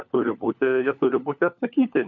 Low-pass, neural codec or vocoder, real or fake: 7.2 kHz; codec, 16 kHz, 4 kbps, FunCodec, trained on LibriTTS, 50 frames a second; fake